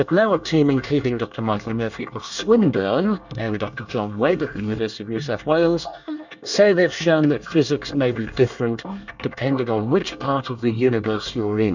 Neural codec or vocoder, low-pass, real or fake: codec, 24 kHz, 1 kbps, SNAC; 7.2 kHz; fake